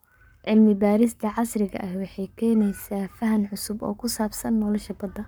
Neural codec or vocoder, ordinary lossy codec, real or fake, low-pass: codec, 44.1 kHz, 7.8 kbps, Pupu-Codec; none; fake; none